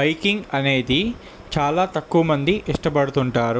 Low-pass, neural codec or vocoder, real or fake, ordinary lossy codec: none; none; real; none